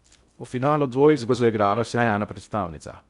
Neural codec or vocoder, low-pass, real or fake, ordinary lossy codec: codec, 16 kHz in and 24 kHz out, 0.6 kbps, FocalCodec, streaming, 2048 codes; 10.8 kHz; fake; Opus, 64 kbps